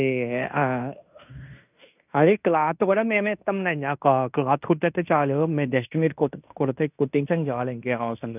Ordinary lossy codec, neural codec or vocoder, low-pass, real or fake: none; codec, 16 kHz in and 24 kHz out, 0.9 kbps, LongCat-Audio-Codec, fine tuned four codebook decoder; 3.6 kHz; fake